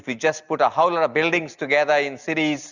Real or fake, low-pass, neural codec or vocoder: real; 7.2 kHz; none